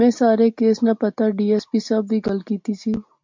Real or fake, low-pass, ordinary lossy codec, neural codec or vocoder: real; 7.2 kHz; MP3, 48 kbps; none